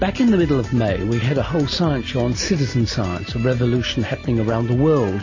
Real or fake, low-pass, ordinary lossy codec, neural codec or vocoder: real; 7.2 kHz; MP3, 32 kbps; none